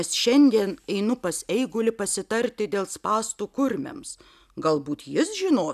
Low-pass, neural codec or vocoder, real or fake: 14.4 kHz; none; real